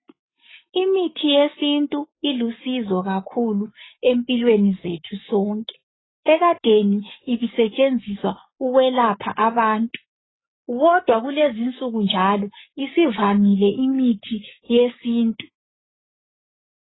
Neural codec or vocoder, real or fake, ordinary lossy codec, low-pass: codec, 44.1 kHz, 7.8 kbps, Pupu-Codec; fake; AAC, 16 kbps; 7.2 kHz